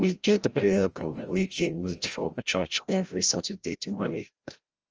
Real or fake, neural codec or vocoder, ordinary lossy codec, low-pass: fake; codec, 16 kHz, 0.5 kbps, FreqCodec, larger model; Opus, 24 kbps; 7.2 kHz